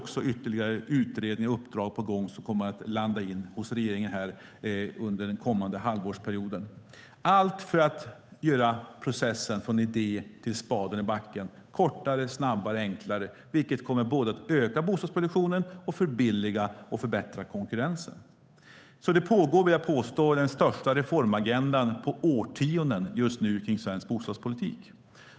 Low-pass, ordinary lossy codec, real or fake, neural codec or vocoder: none; none; fake; codec, 16 kHz, 8 kbps, FunCodec, trained on Chinese and English, 25 frames a second